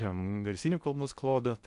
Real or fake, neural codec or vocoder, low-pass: fake; codec, 16 kHz in and 24 kHz out, 0.6 kbps, FocalCodec, streaming, 2048 codes; 10.8 kHz